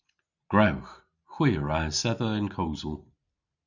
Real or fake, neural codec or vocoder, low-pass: real; none; 7.2 kHz